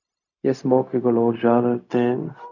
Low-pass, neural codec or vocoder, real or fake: 7.2 kHz; codec, 16 kHz, 0.4 kbps, LongCat-Audio-Codec; fake